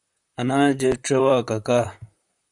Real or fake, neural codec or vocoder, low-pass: fake; vocoder, 44.1 kHz, 128 mel bands, Pupu-Vocoder; 10.8 kHz